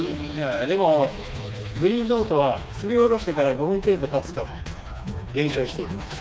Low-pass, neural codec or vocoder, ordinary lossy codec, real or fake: none; codec, 16 kHz, 2 kbps, FreqCodec, smaller model; none; fake